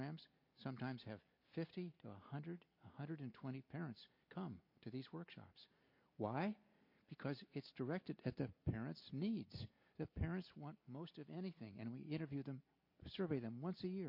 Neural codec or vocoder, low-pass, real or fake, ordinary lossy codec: none; 5.4 kHz; real; MP3, 32 kbps